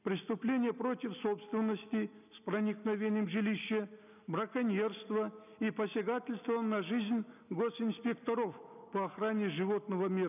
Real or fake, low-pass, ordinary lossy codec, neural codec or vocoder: real; 3.6 kHz; none; none